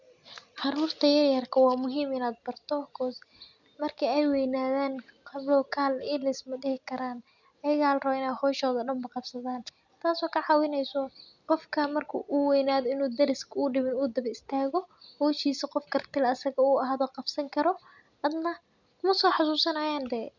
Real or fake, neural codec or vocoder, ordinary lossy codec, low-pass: real; none; none; 7.2 kHz